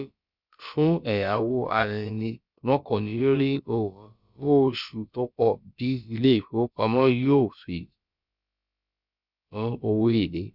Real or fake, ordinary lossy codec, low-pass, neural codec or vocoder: fake; Opus, 64 kbps; 5.4 kHz; codec, 16 kHz, about 1 kbps, DyCAST, with the encoder's durations